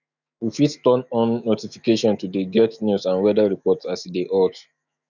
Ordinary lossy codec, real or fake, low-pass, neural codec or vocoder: none; fake; 7.2 kHz; autoencoder, 48 kHz, 128 numbers a frame, DAC-VAE, trained on Japanese speech